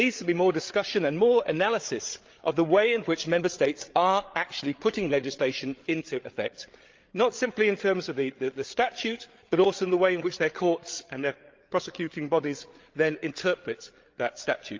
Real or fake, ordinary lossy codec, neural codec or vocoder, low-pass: fake; Opus, 32 kbps; codec, 16 kHz, 8 kbps, FreqCodec, larger model; 7.2 kHz